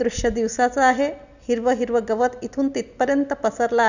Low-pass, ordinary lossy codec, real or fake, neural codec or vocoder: 7.2 kHz; none; real; none